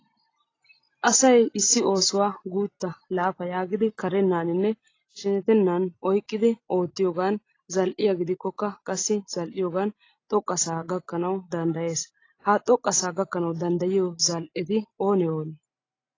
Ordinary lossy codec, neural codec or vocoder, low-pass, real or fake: AAC, 32 kbps; none; 7.2 kHz; real